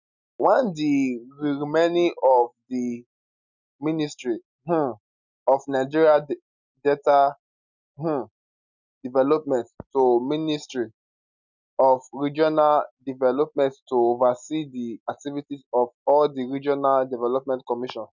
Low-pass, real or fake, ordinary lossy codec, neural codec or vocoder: 7.2 kHz; real; none; none